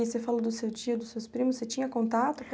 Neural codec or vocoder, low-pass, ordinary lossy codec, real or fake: none; none; none; real